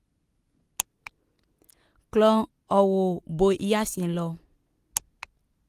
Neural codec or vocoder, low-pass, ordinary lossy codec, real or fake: none; 14.4 kHz; Opus, 24 kbps; real